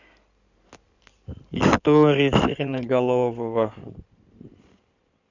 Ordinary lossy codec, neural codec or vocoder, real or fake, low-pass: none; codec, 16 kHz in and 24 kHz out, 2.2 kbps, FireRedTTS-2 codec; fake; 7.2 kHz